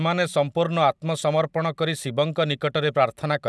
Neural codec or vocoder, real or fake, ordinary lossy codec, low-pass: none; real; none; none